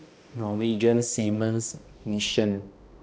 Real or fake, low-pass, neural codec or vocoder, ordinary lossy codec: fake; none; codec, 16 kHz, 1 kbps, X-Codec, HuBERT features, trained on balanced general audio; none